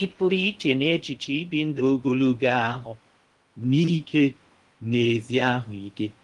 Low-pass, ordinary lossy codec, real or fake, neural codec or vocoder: 10.8 kHz; Opus, 24 kbps; fake; codec, 16 kHz in and 24 kHz out, 0.6 kbps, FocalCodec, streaming, 2048 codes